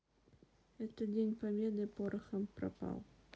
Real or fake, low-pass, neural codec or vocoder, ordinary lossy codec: real; none; none; none